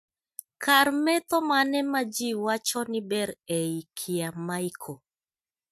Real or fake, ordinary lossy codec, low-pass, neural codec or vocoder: real; MP3, 96 kbps; 14.4 kHz; none